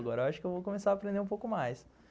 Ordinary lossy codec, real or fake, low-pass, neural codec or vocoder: none; real; none; none